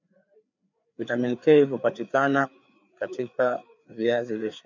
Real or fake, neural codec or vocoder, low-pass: fake; codec, 16 kHz, 4 kbps, FreqCodec, larger model; 7.2 kHz